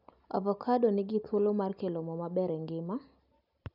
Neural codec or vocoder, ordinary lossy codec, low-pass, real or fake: none; none; 5.4 kHz; real